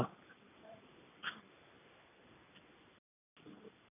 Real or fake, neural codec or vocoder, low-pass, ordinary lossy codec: real; none; 3.6 kHz; none